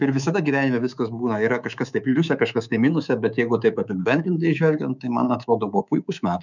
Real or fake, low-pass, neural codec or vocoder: fake; 7.2 kHz; codec, 24 kHz, 3.1 kbps, DualCodec